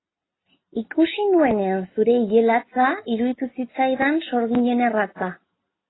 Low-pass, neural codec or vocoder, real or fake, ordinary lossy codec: 7.2 kHz; none; real; AAC, 16 kbps